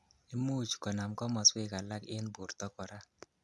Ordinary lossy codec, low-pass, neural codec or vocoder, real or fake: none; none; none; real